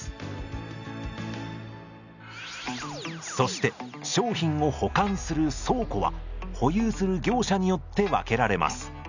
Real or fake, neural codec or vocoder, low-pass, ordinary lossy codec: real; none; 7.2 kHz; none